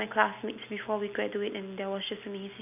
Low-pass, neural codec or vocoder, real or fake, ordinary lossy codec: 3.6 kHz; none; real; none